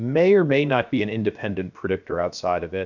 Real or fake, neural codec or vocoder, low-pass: fake; codec, 16 kHz, 0.7 kbps, FocalCodec; 7.2 kHz